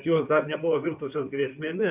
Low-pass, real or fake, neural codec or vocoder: 3.6 kHz; fake; codec, 16 kHz in and 24 kHz out, 2.2 kbps, FireRedTTS-2 codec